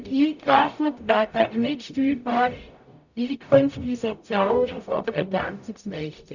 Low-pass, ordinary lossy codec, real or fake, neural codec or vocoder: 7.2 kHz; none; fake; codec, 44.1 kHz, 0.9 kbps, DAC